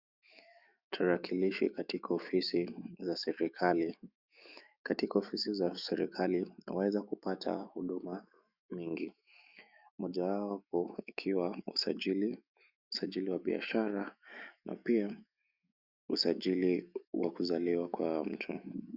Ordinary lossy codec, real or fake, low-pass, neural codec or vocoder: Opus, 64 kbps; fake; 5.4 kHz; autoencoder, 48 kHz, 128 numbers a frame, DAC-VAE, trained on Japanese speech